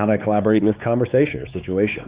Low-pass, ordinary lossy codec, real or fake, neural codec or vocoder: 3.6 kHz; Opus, 64 kbps; fake; codec, 16 kHz, 4 kbps, X-Codec, WavLM features, trained on Multilingual LibriSpeech